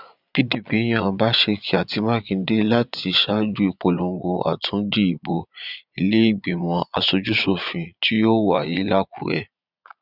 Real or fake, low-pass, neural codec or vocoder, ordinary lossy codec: fake; 5.4 kHz; vocoder, 22.05 kHz, 80 mel bands, Vocos; none